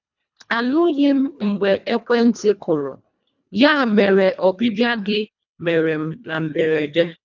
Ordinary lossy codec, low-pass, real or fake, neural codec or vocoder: none; 7.2 kHz; fake; codec, 24 kHz, 1.5 kbps, HILCodec